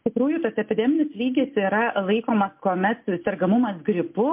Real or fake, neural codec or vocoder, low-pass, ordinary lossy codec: real; none; 3.6 kHz; MP3, 32 kbps